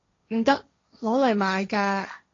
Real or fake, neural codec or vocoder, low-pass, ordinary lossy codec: fake; codec, 16 kHz, 1.1 kbps, Voila-Tokenizer; 7.2 kHz; AAC, 32 kbps